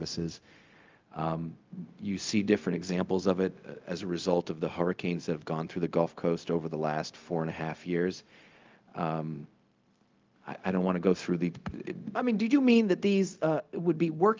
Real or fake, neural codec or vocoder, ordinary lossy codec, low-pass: fake; codec, 16 kHz, 0.4 kbps, LongCat-Audio-Codec; Opus, 32 kbps; 7.2 kHz